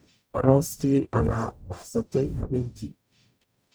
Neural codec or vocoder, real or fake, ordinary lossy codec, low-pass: codec, 44.1 kHz, 0.9 kbps, DAC; fake; none; none